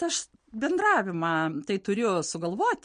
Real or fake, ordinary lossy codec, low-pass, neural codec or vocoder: real; MP3, 48 kbps; 9.9 kHz; none